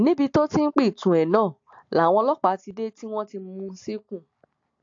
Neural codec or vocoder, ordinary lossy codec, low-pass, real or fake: none; AAC, 48 kbps; 7.2 kHz; real